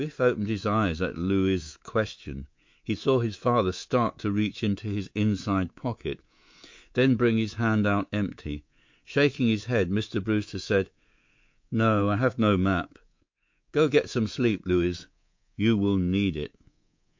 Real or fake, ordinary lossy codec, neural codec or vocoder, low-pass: fake; MP3, 48 kbps; codec, 24 kHz, 3.1 kbps, DualCodec; 7.2 kHz